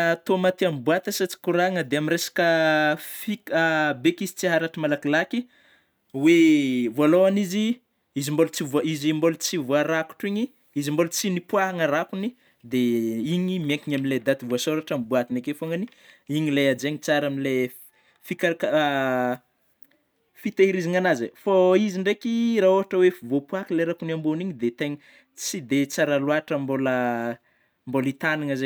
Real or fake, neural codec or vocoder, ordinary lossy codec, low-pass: real; none; none; none